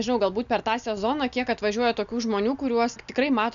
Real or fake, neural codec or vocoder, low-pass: real; none; 7.2 kHz